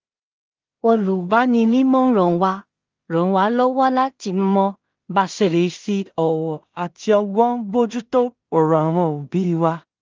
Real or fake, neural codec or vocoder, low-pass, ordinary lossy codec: fake; codec, 16 kHz in and 24 kHz out, 0.4 kbps, LongCat-Audio-Codec, two codebook decoder; 7.2 kHz; Opus, 32 kbps